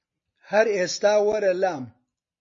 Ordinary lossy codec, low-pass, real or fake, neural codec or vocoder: MP3, 32 kbps; 7.2 kHz; real; none